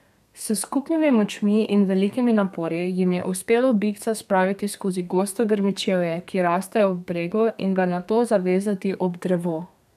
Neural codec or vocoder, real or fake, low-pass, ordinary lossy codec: codec, 32 kHz, 1.9 kbps, SNAC; fake; 14.4 kHz; none